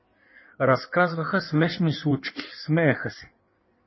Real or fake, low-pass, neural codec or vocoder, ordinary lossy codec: fake; 7.2 kHz; codec, 16 kHz in and 24 kHz out, 1.1 kbps, FireRedTTS-2 codec; MP3, 24 kbps